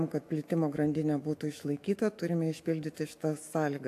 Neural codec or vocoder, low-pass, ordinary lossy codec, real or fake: autoencoder, 48 kHz, 128 numbers a frame, DAC-VAE, trained on Japanese speech; 14.4 kHz; AAC, 64 kbps; fake